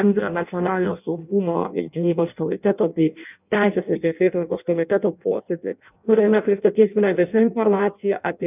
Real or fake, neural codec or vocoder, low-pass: fake; codec, 16 kHz in and 24 kHz out, 0.6 kbps, FireRedTTS-2 codec; 3.6 kHz